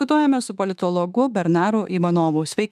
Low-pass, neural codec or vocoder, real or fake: 14.4 kHz; autoencoder, 48 kHz, 32 numbers a frame, DAC-VAE, trained on Japanese speech; fake